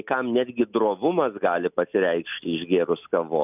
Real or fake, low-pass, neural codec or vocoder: real; 3.6 kHz; none